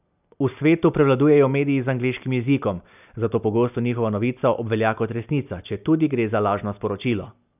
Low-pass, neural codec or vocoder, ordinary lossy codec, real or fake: 3.6 kHz; none; none; real